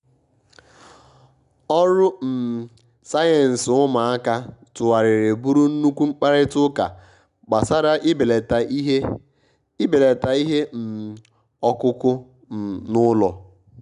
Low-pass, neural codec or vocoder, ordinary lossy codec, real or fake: 10.8 kHz; none; none; real